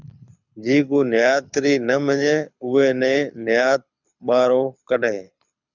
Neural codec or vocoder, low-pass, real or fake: codec, 24 kHz, 6 kbps, HILCodec; 7.2 kHz; fake